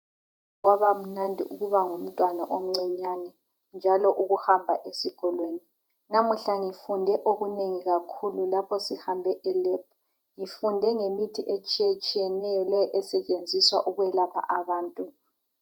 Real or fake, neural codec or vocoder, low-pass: fake; vocoder, 48 kHz, 128 mel bands, Vocos; 19.8 kHz